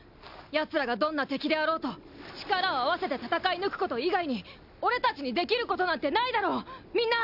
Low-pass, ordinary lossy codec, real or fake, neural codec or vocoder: 5.4 kHz; none; real; none